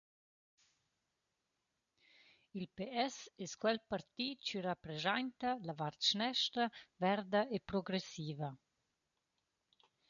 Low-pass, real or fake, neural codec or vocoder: 7.2 kHz; real; none